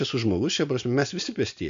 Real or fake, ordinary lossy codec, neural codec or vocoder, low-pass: real; MP3, 64 kbps; none; 7.2 kHz